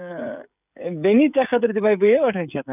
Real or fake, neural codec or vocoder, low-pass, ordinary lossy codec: fake; codec, 16 kHz, 16 kbps, FreqCodec, smaller model; 3.6 kHz; none